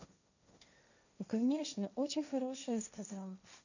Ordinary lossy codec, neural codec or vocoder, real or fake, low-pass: none; codec, 16 kHz, 1.1 kbps, Voila-Tokenizer; fake; 7.2 kHz